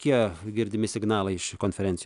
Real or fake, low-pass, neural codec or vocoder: real; 10.8 kHz; none